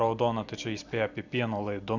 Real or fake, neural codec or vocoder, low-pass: real; none; 7.2 kHz